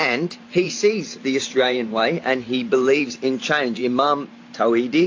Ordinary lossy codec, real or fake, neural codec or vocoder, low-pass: AAC, 48 kbps; fake; vocoder, 44.1 kHz, 128 mel bands every 512 samples, BigVGAN v2; 7.2 kHz